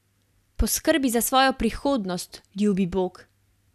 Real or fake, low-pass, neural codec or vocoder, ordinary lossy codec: real; 14.4 kHz; none; none